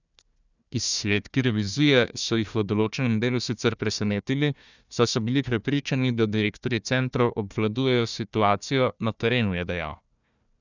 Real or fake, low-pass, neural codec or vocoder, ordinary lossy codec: fake; 7.2 kHz; codec, 16 kHz, 1 kbps, FunCodec, trained on Chinese and English, 50 frames a second; none